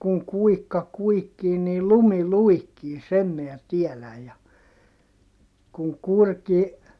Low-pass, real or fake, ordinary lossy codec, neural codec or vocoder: none; real; none; none